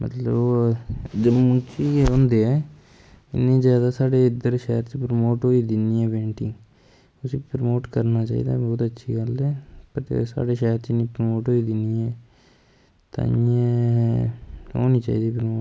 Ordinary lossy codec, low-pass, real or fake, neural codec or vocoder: none; none; real; none